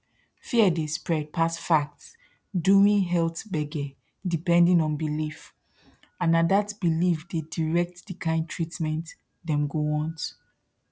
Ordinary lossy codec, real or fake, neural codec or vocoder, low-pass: none; real; none; none